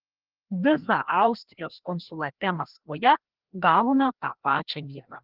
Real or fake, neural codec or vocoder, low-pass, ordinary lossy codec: fake; codec, 16 kHz, 1 kbps, FreqCodec, larger model; 5.4 kHz; Opus, 16 kbps